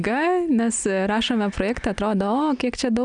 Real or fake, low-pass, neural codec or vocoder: real; 9.9 kHz; none